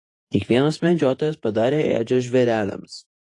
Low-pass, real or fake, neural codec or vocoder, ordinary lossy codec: 10.8 kHz; fake; vocoder, 48 kHz, 128 mel bands, Vocos; AAC, 48 kbps